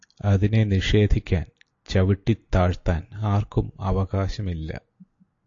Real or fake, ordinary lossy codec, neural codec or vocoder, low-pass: real; AAC, 48 kbps; none; 7.2 kHz